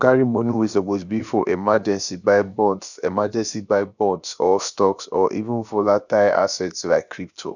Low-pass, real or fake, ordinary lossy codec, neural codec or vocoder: 7.2 kHz; fake; none; codec, 16 kHz, about 1 kbps, DyCAST, with the encoder's durations